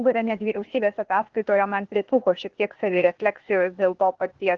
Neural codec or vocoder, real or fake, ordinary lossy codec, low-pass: codec, 16 kHz, 0.8 kbps, ZipCodec; fake; Opus, 16 kbps; 7.2 kHz